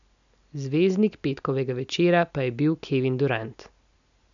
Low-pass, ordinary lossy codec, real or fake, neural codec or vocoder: 7.2 kHz; none; real; none